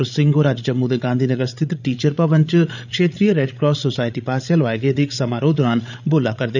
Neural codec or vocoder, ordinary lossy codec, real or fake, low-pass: codec, 16 kHz, 16 kbps, FreqCodec, larger model; none; fake; 7.2 kHz